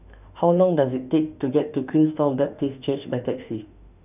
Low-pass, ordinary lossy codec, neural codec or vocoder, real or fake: 3.6 kHz; none; autoencoder, 48 kHz, 32 numbers a frame, DAC-VAE, trained on Japanese speech; fake